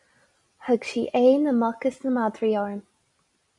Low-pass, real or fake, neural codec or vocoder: 10.8 kHz; real; none